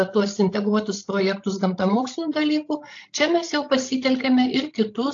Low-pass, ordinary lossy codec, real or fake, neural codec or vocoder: 7.2 kHz; AAC, 48 kbps; fake; codec, 16 kHz, 16 kbps, FreqCodec, larger model